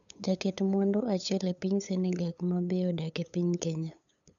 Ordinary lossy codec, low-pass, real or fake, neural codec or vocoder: none; 7.2 kHz; fake; codec, 16 kHz, 8 kbps, FunCodec, trained on LibriTTS, 25 frames a second